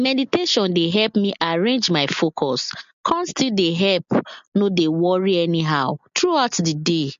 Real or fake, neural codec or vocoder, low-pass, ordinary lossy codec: real; none; 7.2 kHz; MP3, 48 kbps